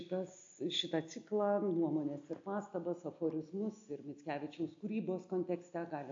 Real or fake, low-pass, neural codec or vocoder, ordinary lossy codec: real; 7.2 kHz; none; AAC, 48 kbps